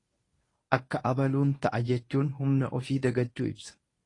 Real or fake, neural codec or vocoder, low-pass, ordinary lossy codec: fake; codec, 24 kHz, 0.9 kbps, WavTokenizer, medium speech release version 1; 10.8 kHz; AAC, 32 kbps